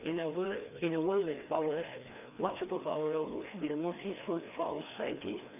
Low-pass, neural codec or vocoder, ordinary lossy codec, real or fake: 3.6 kHz; codec, 16 kHz, 2 kbps, FreqCodec, larger model; none; fake